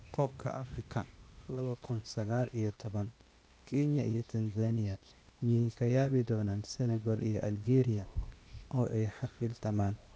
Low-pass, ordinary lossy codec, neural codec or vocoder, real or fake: none; none; codec, 16 kHz, 0.8 kbps, ZipCodec; fake